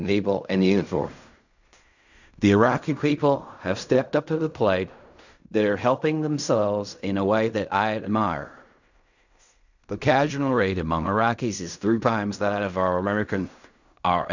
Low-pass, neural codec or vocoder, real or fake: 7.2 kHz; codec, 16 kHz in and 24 kHz out, 0.4 kbps, LongCat-Audio-Codec, fine tuned four codebook decoder; fake